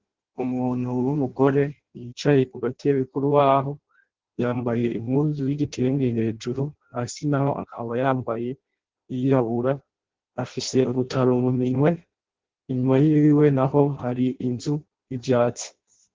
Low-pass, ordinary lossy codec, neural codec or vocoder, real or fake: 7.2 kHz; Opus, 16 kbps; codec, 16 kHz in and 24 kHz out, 0.6 kbps, FireRedTTS-2 codec; fake